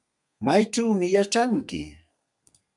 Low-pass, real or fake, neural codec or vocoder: 10.8 kHz; fake; codec, 32 kHz, 1.9 kbps, SNAC